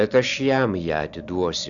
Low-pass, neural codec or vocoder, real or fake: 7.2 kHz; none; real